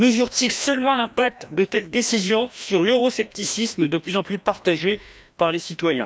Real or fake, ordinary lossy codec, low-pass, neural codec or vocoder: fake; none; none; codec, 16 kHz, 1 kbps, FreqCodec, larger model